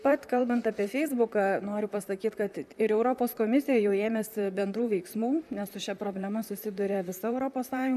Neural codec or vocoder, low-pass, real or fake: vocoder, 44.1 kHz, 128 mel bands, Pupu-Vocoder; 14.4 kHz; fake